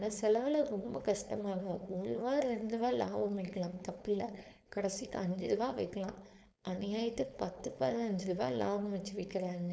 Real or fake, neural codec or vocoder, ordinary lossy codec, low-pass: fake; codec, 16 kHz, 4.8 kbps, FACodec; none; none